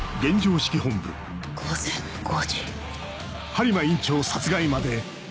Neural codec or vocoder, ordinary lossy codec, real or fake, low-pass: none; none; real; none